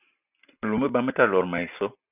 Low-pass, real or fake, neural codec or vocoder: 3.6 kHz; real; none